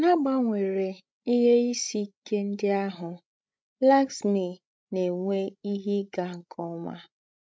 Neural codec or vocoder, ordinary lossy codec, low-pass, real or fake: codec, 16 kHz, 16 kbps, FreqCodec, larger model; none; none; fake